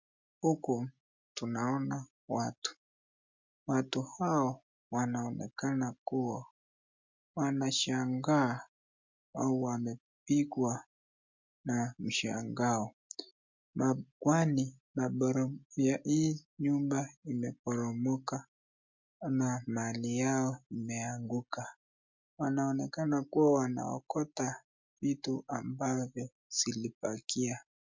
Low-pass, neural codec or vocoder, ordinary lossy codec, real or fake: 7.2 kHz; none; MP3, 64 kbps; real